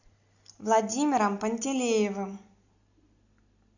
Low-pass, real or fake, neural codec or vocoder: 7.2 kHz; real; none